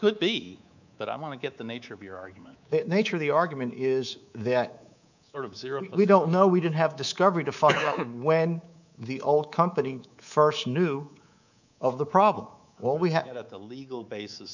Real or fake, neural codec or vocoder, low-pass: fake; codec, 24 kHz, 3.1 kbps, DualCodec; 7.2 kHz